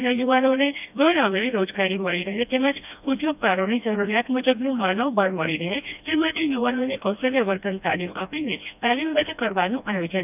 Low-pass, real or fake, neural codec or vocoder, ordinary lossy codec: 3.6 kHz; fake; codec, 16 kHz, 1 kbps, FreqCodec, smaller model; none